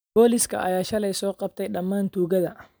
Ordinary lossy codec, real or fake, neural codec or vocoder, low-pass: none; real; none; none